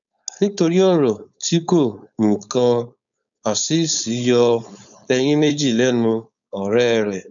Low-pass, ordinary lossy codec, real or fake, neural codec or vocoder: 7.2 kHz; none; fake; codec, 16 kHz, 4.8 kbps, FACodec